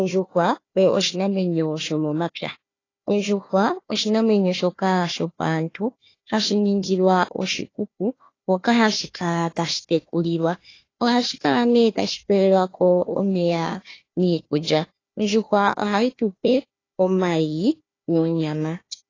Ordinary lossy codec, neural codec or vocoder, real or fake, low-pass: AAC, 32 kbps; codec, 16 kHz, 1 kbps, FunCodec, trained on Chinese and English, 50 frames a second; fake; 7.2 kHz